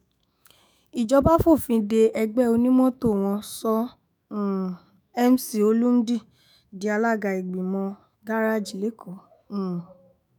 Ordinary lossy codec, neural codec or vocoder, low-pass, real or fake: none; autoencoder, 48 kHz, 128 numbers a frame, DAC-VAE, trained on Japanese speech; none; fake